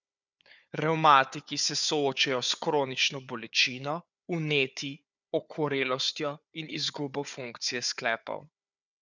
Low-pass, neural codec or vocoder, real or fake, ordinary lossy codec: 7.2 kHz; codec, 16 kHz, 4 kbps, FunCodec, trained on Chinese and English, 50 frames a second; fake; none